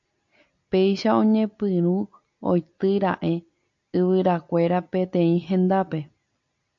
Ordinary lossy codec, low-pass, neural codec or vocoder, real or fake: AAC, 64 kbps; 7.2 kHz; none; real